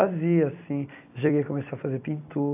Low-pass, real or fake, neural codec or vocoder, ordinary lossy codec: 3.6 kHz; fake; vocoder, 44.1 kHz, 128 mel bands every 256 samples, BigVGAN v2; AAC, 32 kbps